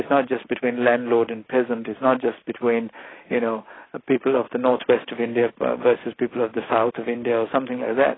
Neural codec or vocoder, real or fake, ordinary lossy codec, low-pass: none; real; AAC, 16 kbps; 7.2 kHz